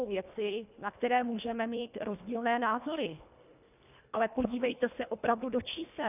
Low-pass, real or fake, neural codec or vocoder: 3.6 kHz; fake; codec, 24 kHz, 1.5 kbps, HILCodec